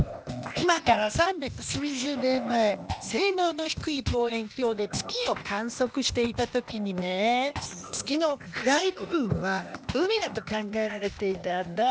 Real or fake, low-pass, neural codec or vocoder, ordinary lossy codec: fake; none; codec, 16 kHz, 0.8 kbps, ZipCodec; none